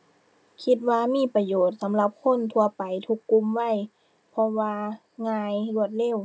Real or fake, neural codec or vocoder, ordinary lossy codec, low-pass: real; none; none; none